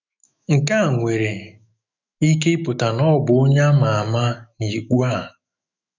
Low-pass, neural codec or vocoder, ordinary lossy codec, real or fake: 7.2 kHz; autoencoder, 48 kHz, 128 numbers a frame, DAC-VAE, trained on Japanese speech; none; fake